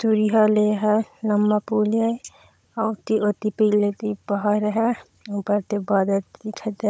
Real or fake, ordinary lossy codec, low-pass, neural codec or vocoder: fake; none; none; codec, 16 kHz, 16 kbps, FunCodec, trained on Chinese and English, 50 frames a second